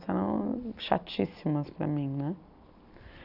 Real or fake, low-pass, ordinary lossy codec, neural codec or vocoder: real; 5.4 kHz; none; none